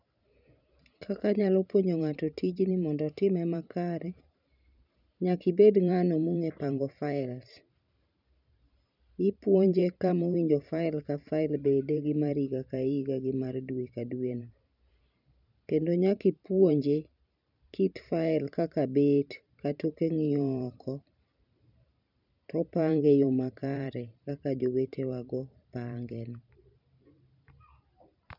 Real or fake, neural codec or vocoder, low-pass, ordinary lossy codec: fake; vocoder, 44.1 kHz, 128 mel bands every 512 samples, BigVGAN v2; 5.4 kHz; none